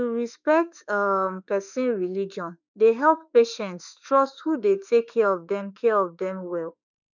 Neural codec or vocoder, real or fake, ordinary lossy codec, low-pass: autoencoder, 48 kHz, 32 numbers a frame, DAC-VAE, trained on Japanese speech; fake; none; 7.2 kHz